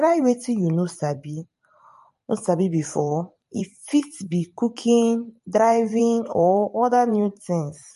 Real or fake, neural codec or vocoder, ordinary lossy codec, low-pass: fake; vocoder, 44.1 kHz, 128 mel bands every 512 samples, BigVGAN v2; MP3, 48 kbps; 14.4 kHz